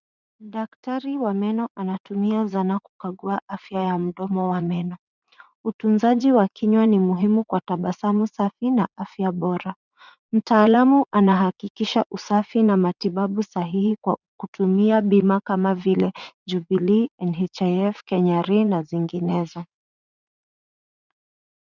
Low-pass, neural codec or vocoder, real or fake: 7.2 kHz; vocoder, 44.1 kHz, 80 mel bands, Vocos; fake